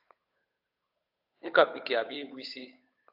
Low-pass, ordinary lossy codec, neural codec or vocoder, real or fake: 5.4 kHz; AAC, 48 kbps; codec, 16 kHz, 8 kbps, FunCodec, trained on Chinese and English, 25 frames a second; fake